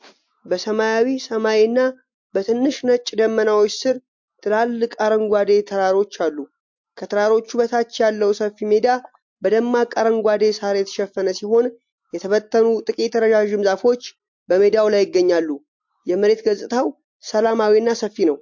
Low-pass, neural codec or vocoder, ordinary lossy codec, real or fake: 7.2 kHz; none; MP3, 48 kbps; real